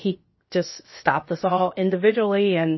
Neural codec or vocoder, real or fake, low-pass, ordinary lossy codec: codec, 16 kHz, about 1 kbps, DyCAST, with the encoder's durations; fake; 7.2 kHz; MP3, 24 kbps